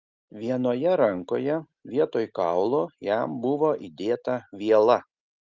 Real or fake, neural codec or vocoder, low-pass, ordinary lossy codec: real; none; 7.2 kHz; Opus, 24 kbps